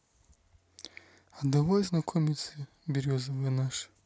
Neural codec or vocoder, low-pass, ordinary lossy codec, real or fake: none; none; none; real